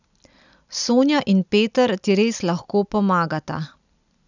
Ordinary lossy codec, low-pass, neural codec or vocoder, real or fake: none; 7.2 kHz; none; real